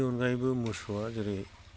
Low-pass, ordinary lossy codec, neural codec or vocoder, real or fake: none; none; none; real